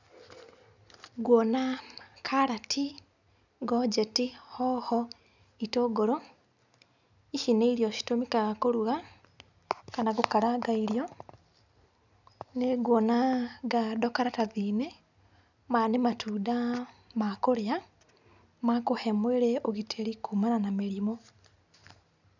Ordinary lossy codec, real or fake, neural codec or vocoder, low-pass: none; real; none; 7.2 kHz